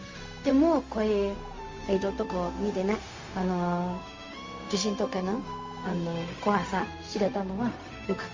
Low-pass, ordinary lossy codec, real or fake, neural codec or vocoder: 7.2 kHz; Opus, 32 kbps; fake; codec, 16 kHz, 0.4 kbps, LongCat-Audio-Codec